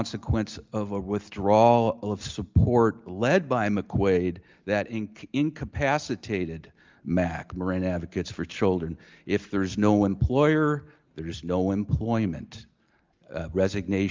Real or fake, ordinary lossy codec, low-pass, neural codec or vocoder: real; Opus, 24 kbps; 7.2 kHz; none